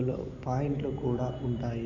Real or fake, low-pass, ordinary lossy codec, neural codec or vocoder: real; 7.2 kHz; none; none